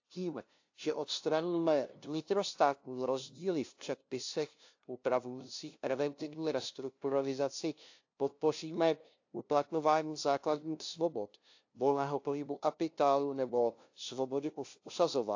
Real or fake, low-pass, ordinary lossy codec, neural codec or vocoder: fake; 7.2 kHz; AAC, 48 kbps; codec, 16 kHz, 0.5 kbps, FunCodec, trained on LibriTTS, 25 frames a second